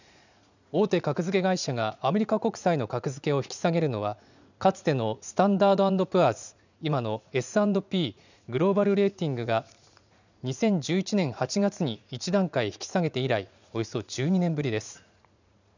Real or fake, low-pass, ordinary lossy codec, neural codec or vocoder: fake; 7.2 kHz; none; vocoder, 44.1 kHz, 80 mel bands, Vocos